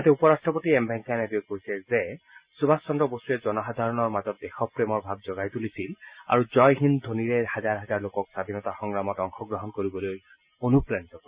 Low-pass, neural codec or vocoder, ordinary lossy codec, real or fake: 3.6 kHz; none; Opus, 64 kbps; real